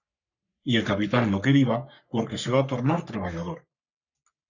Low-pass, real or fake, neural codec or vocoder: 7.2 kHz; fake; codec, 44.1 kHz, 3.4 kbps, Pupu-Codec